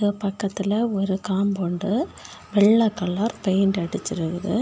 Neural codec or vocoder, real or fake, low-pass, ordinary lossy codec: none; real; none; none